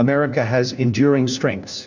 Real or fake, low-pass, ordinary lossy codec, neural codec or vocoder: fake; 7.2 kHz; Opus, 64 kbps; codec, 16 kHz, 1 kbps, FunCodec, trained on LibriTTS, 50 frames a second